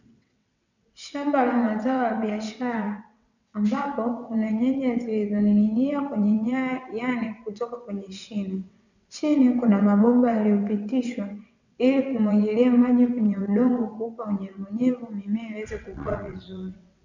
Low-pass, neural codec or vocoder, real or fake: 7.2 kHz; vocoder, 22.05 kHz, 80 mel bands, WaveNeXt; fake